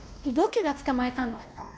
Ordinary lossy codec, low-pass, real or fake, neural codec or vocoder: none; none; fake; codec, 16 kHz, 1 kbps, X-Codec, WavLM features, trained on Multilingual LibriSpeech